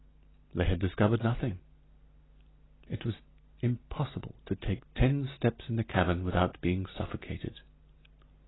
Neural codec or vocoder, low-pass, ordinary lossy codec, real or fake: none; 7.2 kHz; AAC, 16 kbps; real